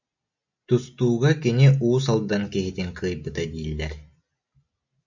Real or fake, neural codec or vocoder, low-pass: real; none; 7.2 kHz